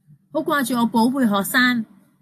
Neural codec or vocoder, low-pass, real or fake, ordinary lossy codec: none; 14.4 kHz; real; AAC, 64 kbps